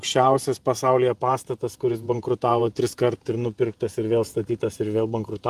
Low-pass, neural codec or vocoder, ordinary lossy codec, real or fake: 14.4 kHz; vocoder, 48 kHz, 128 mel bands, Vocos; Opus, 32 kbps; fake